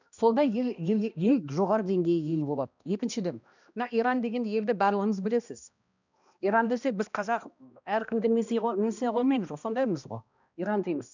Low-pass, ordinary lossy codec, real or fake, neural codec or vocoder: 7.2 kHz; none; fake; codec, 16 kHz, 1 kbps, X-Codec, HuBERT features, trained on balanced general audio